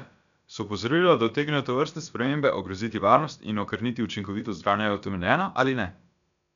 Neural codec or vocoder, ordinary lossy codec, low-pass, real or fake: codec, 16 kHz, about 1 kbps, DyCAST, with the encoder's durations; none; 7.2 kHz; fake